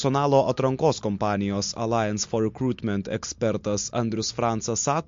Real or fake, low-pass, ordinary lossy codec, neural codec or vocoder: real; 7.2 kHz; MP3, 48 kbps; none